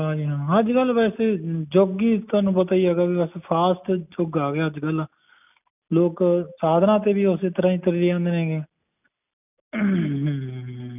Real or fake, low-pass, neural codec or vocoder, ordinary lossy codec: real; 3.6 kHz; none; none